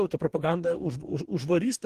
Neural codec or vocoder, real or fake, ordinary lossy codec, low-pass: codec, 44.1 kHz, 2.6 kbps, DAC; fake; Opus, 16 kbps; 14.4 kHz